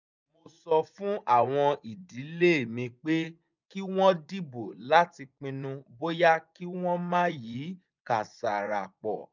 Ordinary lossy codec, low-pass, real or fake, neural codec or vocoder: none; 7.2 kHz; fake; vocoder, 24 kHz, 100 mel bands, Vocos